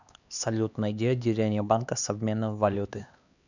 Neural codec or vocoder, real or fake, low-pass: codec, 16 kHz, 2 kbps, X-Codec, HuBERT features, trained on LibriSpeech; fake; 7.2 kHz